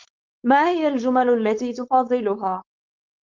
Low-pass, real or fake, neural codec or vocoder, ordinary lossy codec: 7.2 kHz; fake; codec, 16 kHz, 4.8 kbps, FACodec; Opus, 32 kbps